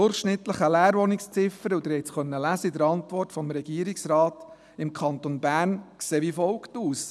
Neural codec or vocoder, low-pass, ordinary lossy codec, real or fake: none; none; none; real